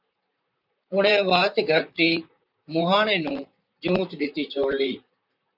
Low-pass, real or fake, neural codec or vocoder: 5.4 kHz; fake; vocoder, 44.1 kHz, 128 mel bands, Pupu-Vocoder